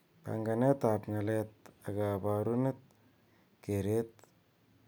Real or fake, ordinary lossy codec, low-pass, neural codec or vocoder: real; none; none; none